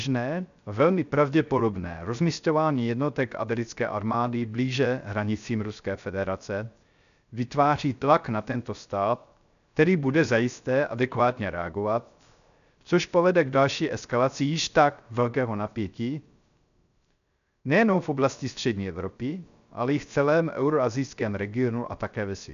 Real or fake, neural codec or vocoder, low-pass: fake; codec, 16 kHz, 0.3 kbps, FocalCodec; 7.2 kHz